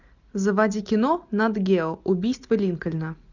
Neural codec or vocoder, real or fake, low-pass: none; real; 7.2 kHz